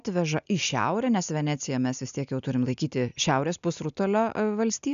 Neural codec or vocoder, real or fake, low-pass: none; real; 7.2 kHz